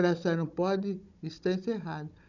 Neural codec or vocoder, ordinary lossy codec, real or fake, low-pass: codec, 16 kHz, 16 kbps, FunCodec, trained on Chinese and English, 50 frames a second; none; fake; 7.2 kHz